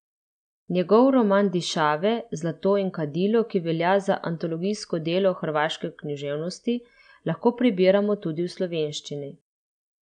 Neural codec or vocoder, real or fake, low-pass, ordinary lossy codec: none; real; 9.9 kHz; none